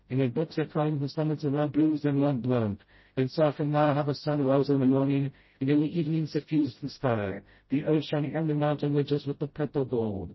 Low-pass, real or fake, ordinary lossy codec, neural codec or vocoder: 7.2 kHz; fake; MP3, 24 kbps; codec, 16 kHz, 0.5 kbps, FreqCodec, smaller model